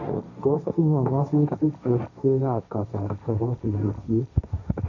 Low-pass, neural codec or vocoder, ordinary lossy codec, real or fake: 7.2 kHz; codec, 16 kHz, 1.1 kbps, Voila-Tokenizer; none; fake